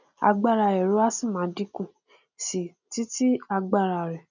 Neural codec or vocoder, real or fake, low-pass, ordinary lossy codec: none; real; 7.2 kHz; none